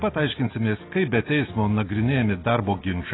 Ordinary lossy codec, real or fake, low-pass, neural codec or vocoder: AAC, 16 kbps; real; 7.2 kHz; none